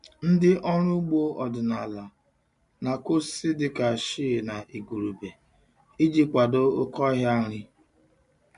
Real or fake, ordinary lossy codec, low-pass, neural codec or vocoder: real; MP3, 64 kbps; 10.8 kHz; none